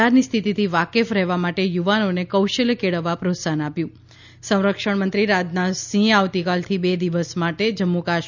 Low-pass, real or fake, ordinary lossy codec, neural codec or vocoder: 7.2 kHz; real; none; none